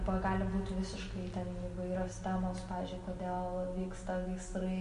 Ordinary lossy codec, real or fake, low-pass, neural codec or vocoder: MP3, 48 kbps; real; 10.8 kHz; none